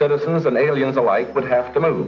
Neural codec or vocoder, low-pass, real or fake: none; 7.2 kHz; real